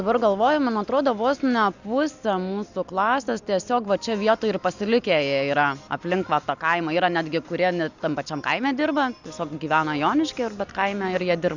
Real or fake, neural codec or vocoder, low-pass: real; none; 7.2 kHz